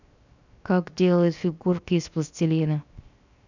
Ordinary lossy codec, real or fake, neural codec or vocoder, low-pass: none; fake; codec, 16 kHz, 0.7 kbps, FocalCodec; 7.2 kHz